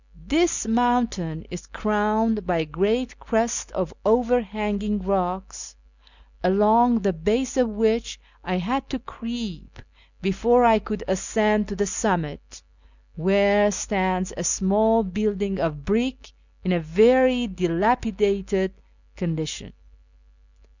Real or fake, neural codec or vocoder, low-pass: real; none; 7.2 kHz